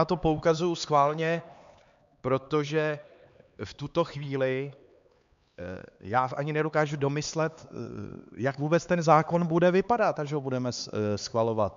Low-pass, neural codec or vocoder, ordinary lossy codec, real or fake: 7.2 kHz; codec, 16 kHz, 4 kbps, X-Codec, HuBERT features, trained on LibriSpeech; MP3, 64 kbps; fake